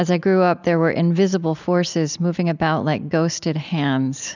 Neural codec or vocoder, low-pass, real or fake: none; 7.2 kHz; real